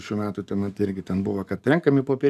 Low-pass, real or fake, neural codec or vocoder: 14.4 kHz; fake; codec, 44.1 kHz, 7.8 kbps, DAC